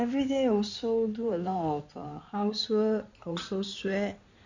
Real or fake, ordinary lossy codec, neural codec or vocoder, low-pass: fake; Opus, 64 kbps; codec, 16 kHz in and 24 kHz out, 2.2 kbps, FireRedTTS-2 codec; 7.2 kHz